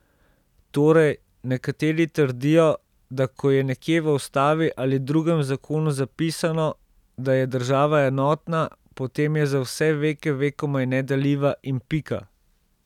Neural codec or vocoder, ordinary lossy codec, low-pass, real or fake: none; none; 19.8 kHz; real